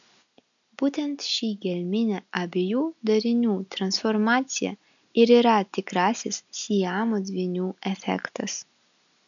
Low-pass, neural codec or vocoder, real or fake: 7.2 kHz; none; real